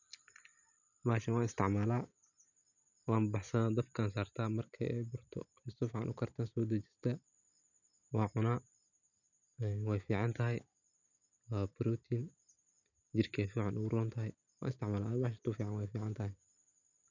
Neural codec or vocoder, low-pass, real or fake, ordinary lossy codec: none; 7.2 kHz; real; none